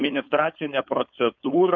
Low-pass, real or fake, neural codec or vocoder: 7.2 kHz; fake; codec, 16 kHz, 4.8 kbps, FACodec